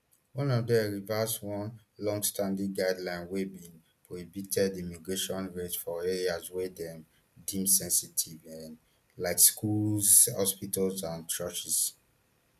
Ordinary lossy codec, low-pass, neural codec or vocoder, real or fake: none; 14.4 kHz; none; real